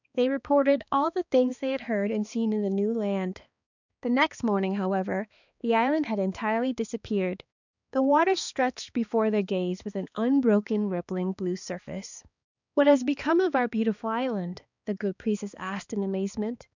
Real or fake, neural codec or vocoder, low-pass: fake; codec, 16 kHz, 2 kbps, X-Codec, HuBERT features, trained on balanced general audio; 7.2 kHz